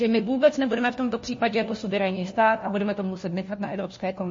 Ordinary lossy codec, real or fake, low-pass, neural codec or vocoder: MP3, 32 kbps; fake; 7.2 kHz; codec, 16 kHz, 1 kbps, FunCodec, trained on LibriTTS, 50 frames a second